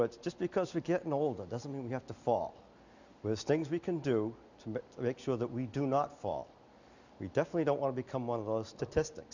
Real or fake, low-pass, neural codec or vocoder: real; 7.2 kHz; none